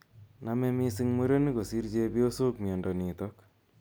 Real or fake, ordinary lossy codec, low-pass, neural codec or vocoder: real; none; none; none